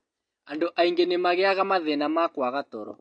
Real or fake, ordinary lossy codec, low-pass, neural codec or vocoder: real; MP3, 48 kbps; 9.9 kHz; none